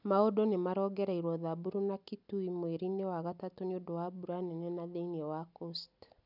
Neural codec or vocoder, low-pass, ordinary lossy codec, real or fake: none; 5.4 kHz; none; real